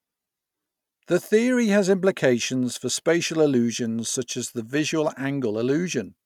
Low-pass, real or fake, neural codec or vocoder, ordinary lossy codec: 19.8 kHz; real; none; none